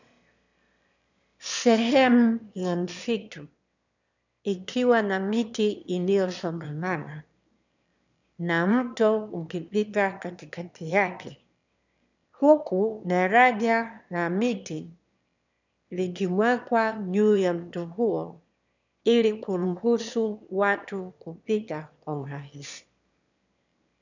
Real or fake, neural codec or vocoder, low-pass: fake; autoencoder, 22.05 kHz, a latent of 192 numbers a frame, VITS, trained on one speaker; 7.2 kHz